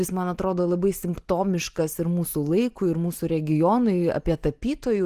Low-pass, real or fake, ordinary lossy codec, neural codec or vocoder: 14.4 kHz; real; Opus, 24 kbps; none